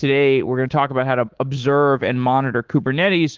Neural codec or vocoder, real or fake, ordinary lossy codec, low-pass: codec, 24 kHz, 3.1 kbps, DualCodec; fake; Opus, 16 kbps; 7.2 kHz